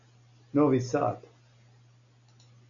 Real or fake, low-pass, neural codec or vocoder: real; 7.2 kHz; none